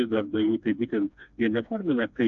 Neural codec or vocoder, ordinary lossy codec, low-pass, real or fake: codec, 16 kHz, 2 kbps, FreqCodec, smaller model; AAC, 64 kbps; 7.2 kHz; fake